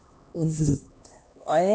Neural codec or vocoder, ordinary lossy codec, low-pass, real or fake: codec, 16 kHz, 1 kbps, X-Codec, HuBERT features, trained on LibriSpeech; none; none; fake